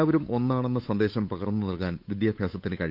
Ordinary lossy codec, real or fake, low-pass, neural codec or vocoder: none; fake; 5.4 kHz; codec, 16 kHz, 8 kbps, FunCodec, trained on Chinese and English, 25 frames a second